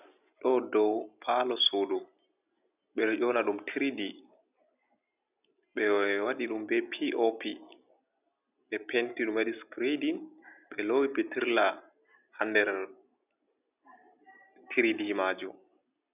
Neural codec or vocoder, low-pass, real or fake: none; 3.6 kHz; real